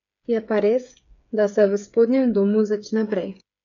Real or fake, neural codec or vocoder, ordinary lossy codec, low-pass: fake; codec, 16 kHz, 8 kbps, FreqCodec, smaller model; none; 7.2 kHz